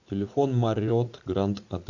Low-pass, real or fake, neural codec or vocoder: 7.2 kHz; fake; vocoder, 44.1 kHz, 80 mel bands, Vocos